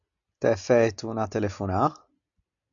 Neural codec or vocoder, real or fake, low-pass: none; real; 7.2 kHz